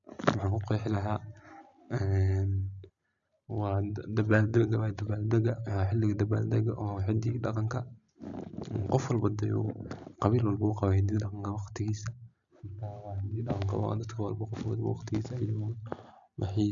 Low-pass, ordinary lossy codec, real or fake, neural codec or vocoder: 7.2 kHz; none; real; none